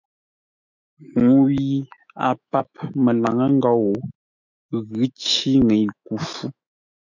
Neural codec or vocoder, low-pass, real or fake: autoencoder, 48 kHz, 128 numbers a frame, DAC-VAE, trained on Japanese speech; 7.2 kHz; fake